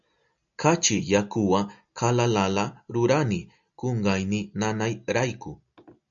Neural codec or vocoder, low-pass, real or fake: none; 7.2 kHz; real